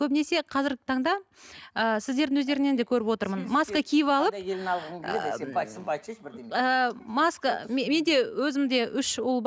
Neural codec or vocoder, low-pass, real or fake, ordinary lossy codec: none; none; real; none